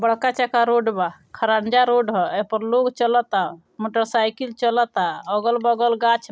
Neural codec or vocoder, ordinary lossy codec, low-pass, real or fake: none; none; none; real